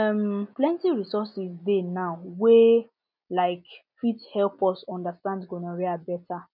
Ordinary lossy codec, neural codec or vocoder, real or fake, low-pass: none; none; real; 5.4 kHz